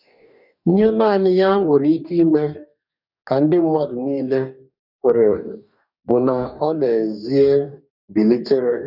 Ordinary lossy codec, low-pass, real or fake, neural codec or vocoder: none; 5.4 kHz; fake; codec, 44.1 kHz, 2.6 kbps, DAC